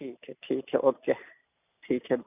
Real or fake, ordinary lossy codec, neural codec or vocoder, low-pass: real; none; none; 3.6 kHz